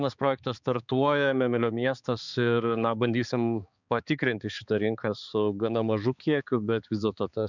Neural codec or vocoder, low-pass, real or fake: codec, 16 kHz, 4 kbps, X-Codec, HuBERT features, trained on balanced general audio; 7.2 kHz; fake